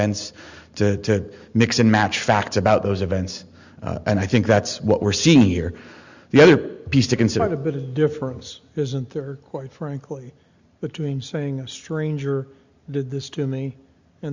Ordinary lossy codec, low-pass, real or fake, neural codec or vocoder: Opus, 64 kbps; 7.2 kHz; real; none